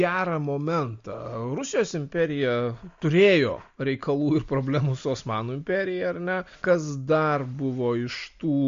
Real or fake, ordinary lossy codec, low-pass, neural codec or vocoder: real; MP3, 48 kbps; 7.2 kHz; none